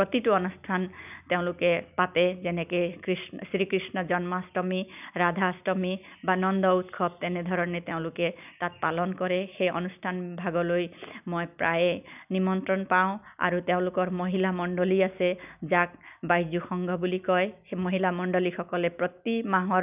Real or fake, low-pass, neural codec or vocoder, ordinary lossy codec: real; 3.6 kHz; none; none